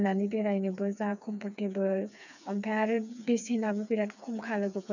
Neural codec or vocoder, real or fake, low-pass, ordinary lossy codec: codec, 16 kHz, 4 kbps, FreqCodec, smaller model; fake; 7.2 kHz; none